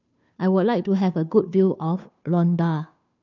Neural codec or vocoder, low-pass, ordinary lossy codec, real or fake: codec, 16 kHz, 2 kbps, FunCodec, trained on Chinese and English, 25 frames a second; 7.2 kHz; none; fake